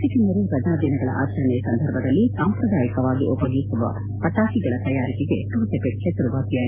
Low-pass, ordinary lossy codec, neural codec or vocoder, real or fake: 3.6 kHz; MP3, 24 kbps; none; real